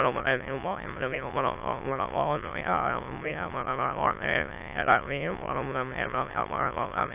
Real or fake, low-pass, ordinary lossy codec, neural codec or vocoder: fake; 3.6 kHz; AAC, 32 kbps; autoencoder, 22.05 kHz, a latent of 192 numbers a frame, VITS, trained on many speakers